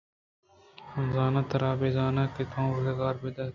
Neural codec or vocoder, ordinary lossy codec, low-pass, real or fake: none; MP3, 48 kbps; 7.2 kHz; real